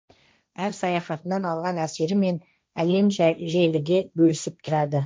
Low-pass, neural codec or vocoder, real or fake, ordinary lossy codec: none; codec, 16 kHz, 1.1 kbps, Voila-Tokenizer; fake; none